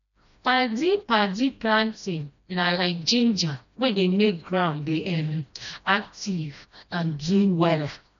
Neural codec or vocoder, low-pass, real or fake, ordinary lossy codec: codec, 16 kHz, 1 kbps, FreqCodec, smaller model; 7.2 kHz; fake; none